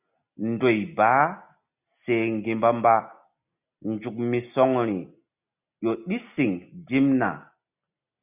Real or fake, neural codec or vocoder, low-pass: real; none; 3.6 kHz